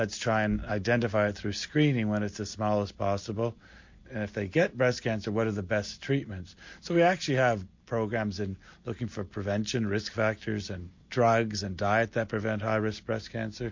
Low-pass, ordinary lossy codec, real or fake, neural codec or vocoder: 7.2 kHz; MP3, 48 kbps; real; none